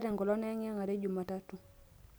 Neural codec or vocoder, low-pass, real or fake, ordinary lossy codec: none; none; real; none